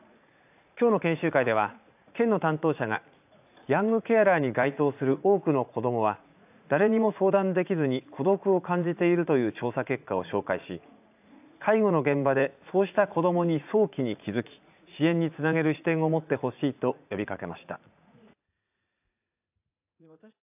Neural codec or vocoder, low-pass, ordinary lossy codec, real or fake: vocoder, 44.1 kHz, 80 mel bands, Vocos; 3.6 kHz; AAC, 32 kbps; fake